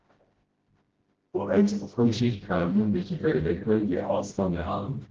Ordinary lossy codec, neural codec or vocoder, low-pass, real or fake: Opus, 16 kbps; codec, 16 kHz, 0.5 kbps, FreqCodec, smaller model; 7.2 kHz; fake